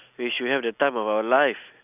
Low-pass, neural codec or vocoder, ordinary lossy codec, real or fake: 3.6 kHz; none; none; real